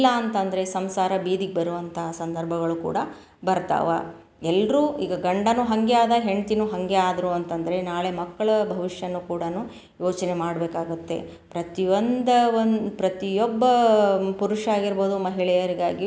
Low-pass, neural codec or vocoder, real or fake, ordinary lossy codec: none; none; real; none